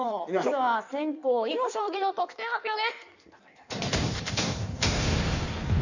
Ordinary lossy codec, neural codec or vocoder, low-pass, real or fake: none; codec, 16 kHz in and 24 kHz out, 1.1 kbps, FireRedTTS-2 codec; 7.2 kHz; fake